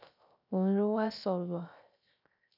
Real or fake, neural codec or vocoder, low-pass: fake; codec, 16 kHz, 0.3 kbps, FocalCodec; 5.4 kHz